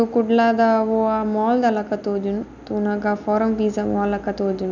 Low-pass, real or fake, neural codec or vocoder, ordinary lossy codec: 7.2 kHz; real; none; none